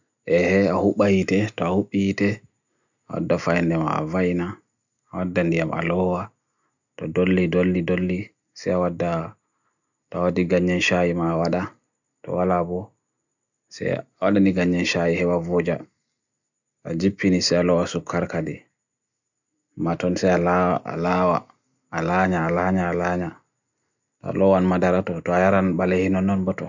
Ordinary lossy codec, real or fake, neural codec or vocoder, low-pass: none; real; none; 7.2 kHz